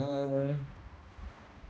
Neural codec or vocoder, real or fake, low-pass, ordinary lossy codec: codec, 16 kHz, 1 kbps, X-Codec, HuBERT features, trained on balanced general audio; fake; none; none